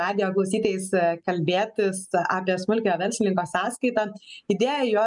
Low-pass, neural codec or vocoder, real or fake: 10.8 kHz; none; real